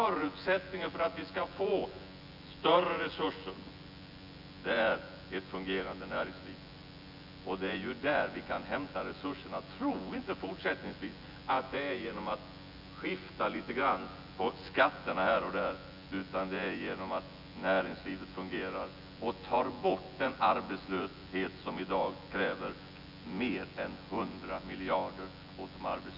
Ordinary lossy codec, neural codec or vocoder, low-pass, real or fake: none; vocoder, 24 kHz, 100 mel bands, Vocos; 5.4 kHz; fake